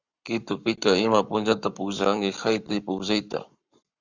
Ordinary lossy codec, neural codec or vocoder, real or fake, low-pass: Opus, 64 kbps; codec, 44.1 kHz, 7.8 kbps, Pupu-Codec; fake; 7.2 kHz